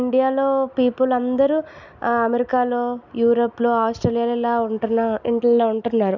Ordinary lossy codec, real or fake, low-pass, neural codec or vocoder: none; real; 7.2 kHz; none